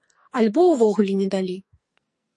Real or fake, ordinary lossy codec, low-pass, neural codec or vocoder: fake; MP3, 48 kbps; 10.8 kHz; codec, 44.1 kHz, 2.6 kbps, SNAC